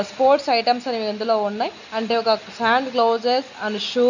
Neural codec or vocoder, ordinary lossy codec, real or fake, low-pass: none; none; real; 7.2 kHz